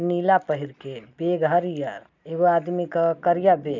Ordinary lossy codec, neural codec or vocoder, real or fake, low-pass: none; none; real; 7.2 kHz